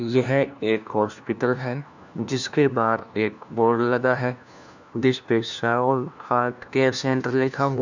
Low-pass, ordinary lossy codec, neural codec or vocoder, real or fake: 7.2 kHz; none; codec, 16 kHz, 1 kbps, FunCodec, trained on LibriTTS, 50 frames a second; fake